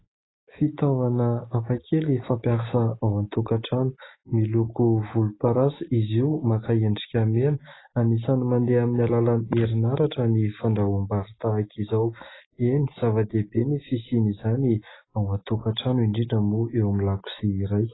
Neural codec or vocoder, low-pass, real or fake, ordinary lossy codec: none; 7.2 kHz; real; AAC, 16 kbps